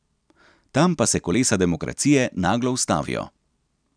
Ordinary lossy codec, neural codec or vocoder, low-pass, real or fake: none; vocoder, 44.1 kHz, 128 mel bands every 256 samples, BigVGAN v2; 9.9 kHz; fake